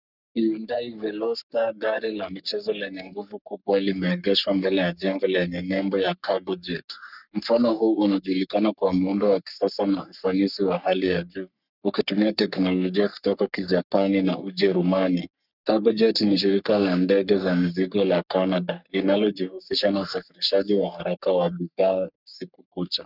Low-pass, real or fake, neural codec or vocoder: 5.4 kHz; fake; codec, 44.1 kHz, 3.4 kbps, Pupu-Codec